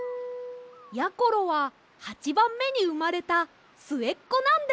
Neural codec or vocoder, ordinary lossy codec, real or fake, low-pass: none; none; real; none